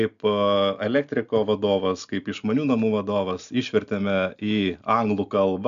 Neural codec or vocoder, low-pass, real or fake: none; 7.2 kHz; real